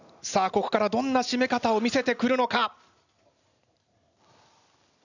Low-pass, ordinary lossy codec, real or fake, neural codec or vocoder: 7.2 kHz; none; real; none